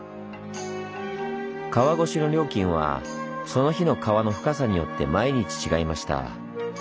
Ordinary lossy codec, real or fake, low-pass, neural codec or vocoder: none; real; none; none